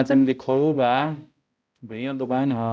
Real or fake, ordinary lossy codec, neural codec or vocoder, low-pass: fake; none; codec, 16 kHz, 0.5 kbps, X-Codec, HuBERT features, trained on balanced general audio; none